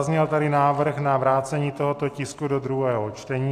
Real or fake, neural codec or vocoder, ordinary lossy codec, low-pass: real; none; AAC, 64 kbps; 14.4 kHz